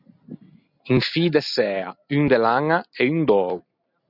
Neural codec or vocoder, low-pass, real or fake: none; 5.4 kHz; real